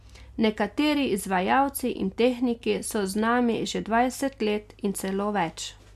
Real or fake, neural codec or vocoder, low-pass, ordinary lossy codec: real; none; 14.4 kHz; MP3, 96 kbps